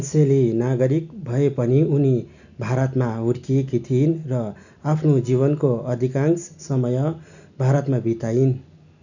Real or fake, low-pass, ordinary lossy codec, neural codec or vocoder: real; 7.2 kHz; none; none